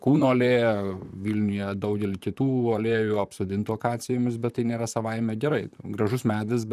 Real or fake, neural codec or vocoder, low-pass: fake; vocoder, 44.1 kHz, 128 mel bands, Pupu-Vocoder; 14.4 kHz